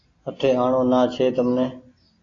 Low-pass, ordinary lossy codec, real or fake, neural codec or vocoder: 7.2 kHz; AAC, 32 kbps; real; none